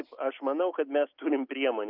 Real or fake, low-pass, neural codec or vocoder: real; 5.4 kHz; none